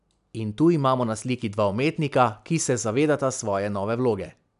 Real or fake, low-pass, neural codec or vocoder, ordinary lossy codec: real; 10.8 kHz; none; none